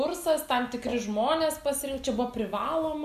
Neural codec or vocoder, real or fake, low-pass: none; real; 14.4 kHz